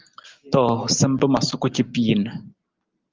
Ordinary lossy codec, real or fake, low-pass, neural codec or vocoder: Opus, 24 kbps; real; 7.2 kHz; none